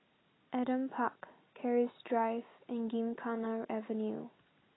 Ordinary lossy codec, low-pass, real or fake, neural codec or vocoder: AAC, 16 kbps; 7.2 kHz; real; none